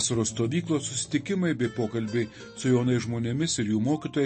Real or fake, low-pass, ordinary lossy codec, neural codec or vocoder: real; 10.8 kHz; MP3, 32 kbps; none